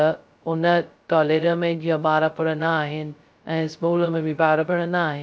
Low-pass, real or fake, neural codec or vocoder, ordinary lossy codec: none; fake; codec, 16 kHz, 0.2 kbps, FocalCodec; none